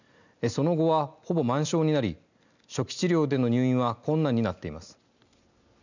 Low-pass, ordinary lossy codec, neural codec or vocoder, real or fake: 7.2 kHz; none; none; real